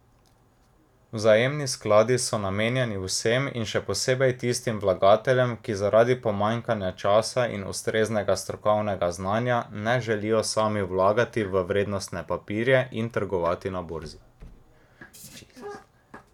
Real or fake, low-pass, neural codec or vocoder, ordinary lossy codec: real; 19.8 kHz; none; none